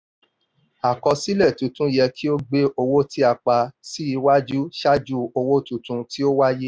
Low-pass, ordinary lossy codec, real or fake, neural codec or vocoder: none; none; real; none